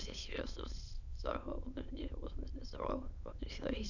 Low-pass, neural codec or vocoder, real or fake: 7.2 kHz; autoencoder, 22.05 kHz, a latent of 192 numbers a frame, VITS, trained on many speakers; fake